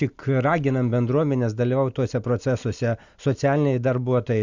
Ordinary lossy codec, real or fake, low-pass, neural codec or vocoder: Opus, 64 kbps; real; 7.2 kHz; none